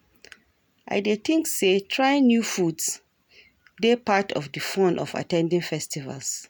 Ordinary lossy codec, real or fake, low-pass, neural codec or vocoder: none; real; none; none